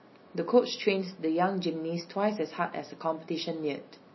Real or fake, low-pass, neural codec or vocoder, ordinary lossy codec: real; 7.2 kHz; none; MP3, 24 kbps